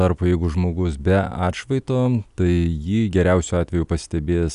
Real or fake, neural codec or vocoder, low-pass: real; none; 10.8 kHz